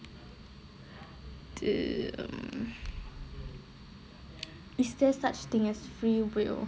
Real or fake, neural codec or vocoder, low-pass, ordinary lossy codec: real; none; none; none